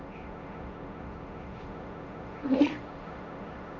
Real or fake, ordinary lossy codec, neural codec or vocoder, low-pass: fake; none; codec, 16 kHz, 1.1 kbps, Voila-Tokenizer; 7.2 kHz